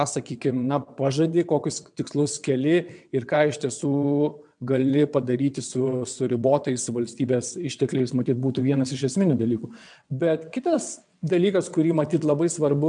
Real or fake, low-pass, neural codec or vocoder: fake; 9.9 kHz; vocoder, 22.05 kHz, 80 mel bands, WaveNeXt